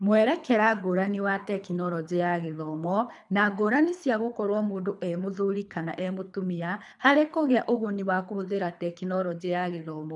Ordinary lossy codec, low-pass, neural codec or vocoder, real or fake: none; 10.8 kHz; codec, 24 kHz, 3 kbps, HILCodec; fake